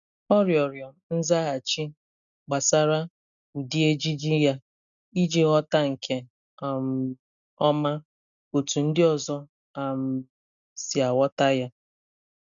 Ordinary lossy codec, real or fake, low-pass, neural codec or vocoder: none; real; 7.2 kHz; none